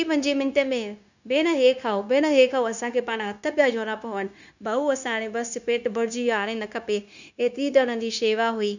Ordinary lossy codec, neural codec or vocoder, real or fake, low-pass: none; codec, 16 kHz, 0.9 kbps, LongCat-Audio-Codec; fake; 7.2 kHz